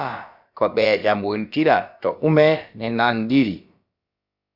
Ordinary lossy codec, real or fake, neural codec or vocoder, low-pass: Opus, 64 kbps; fake; codec, 16 kHz, about 1 kbps, DyCAST, with the encoder's durations; 5.4 kHz